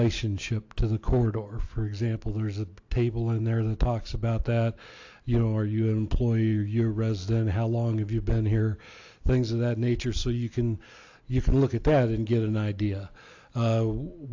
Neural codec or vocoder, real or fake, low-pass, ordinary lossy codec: none; real; 7.2 kHz; AAC, 48 kbps